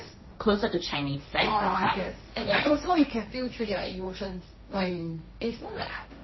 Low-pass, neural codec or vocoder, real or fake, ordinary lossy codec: 7.2 kHz; codec, 16 kHz, 1.1 kbps, Voila-Tokenizer; fake; MP3, 24 kbps